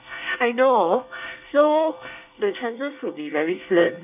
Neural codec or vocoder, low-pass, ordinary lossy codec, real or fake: codec, 24 kHz, 1 kbps, SNAC; 3.6 kHz; none; fake